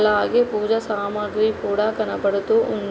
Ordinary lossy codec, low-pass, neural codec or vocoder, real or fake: none; none; none; real